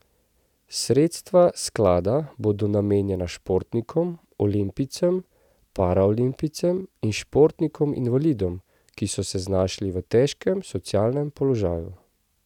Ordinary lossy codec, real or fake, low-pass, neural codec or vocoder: none; real; 19.8 kHz; none